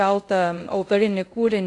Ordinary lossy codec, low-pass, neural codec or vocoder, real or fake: AAC, 48 kbps; 10.8 kHz; codec, 24 kHz, 0.5 kbps, DualCodec; fake